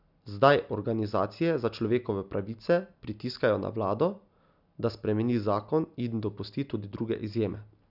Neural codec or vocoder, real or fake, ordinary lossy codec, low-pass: none; real; none; 5.4 kHz